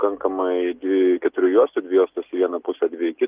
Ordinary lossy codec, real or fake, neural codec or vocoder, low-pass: Opus, 32 kbps; real; none; 3.6 kHz